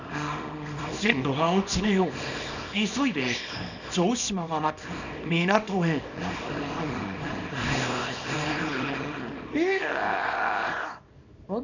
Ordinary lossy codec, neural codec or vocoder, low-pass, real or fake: none; codec, 24 kHz, 0.9 kbps, WavTokenizer, small release; 7.2 kHz; fake